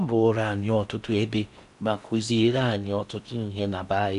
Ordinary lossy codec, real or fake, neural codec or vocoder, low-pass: none; fake; codec, 16 kHz in and 24 kHz out, 0.6 kbps, FocalCodec, streaming, 4096 codes; 10.8 kHz